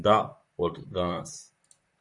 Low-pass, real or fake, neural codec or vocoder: 10.8 kHz; fake; vocoder, 44.1 kHz, 128 mel bands, Pupu-Vocoder